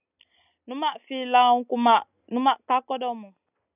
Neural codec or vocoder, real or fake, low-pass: none; real; 3.6 kHz